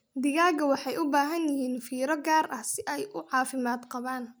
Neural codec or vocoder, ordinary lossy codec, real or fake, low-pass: none; none; real; none